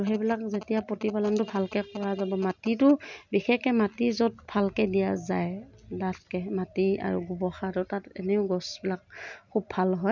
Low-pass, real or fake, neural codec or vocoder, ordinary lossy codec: 7.2 kHz; real; none; none